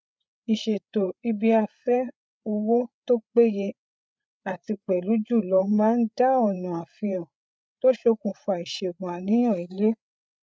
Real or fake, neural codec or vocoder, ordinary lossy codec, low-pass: fake; codec, 16 kHz, 8 kbps, FreqCodec, larger model; none; none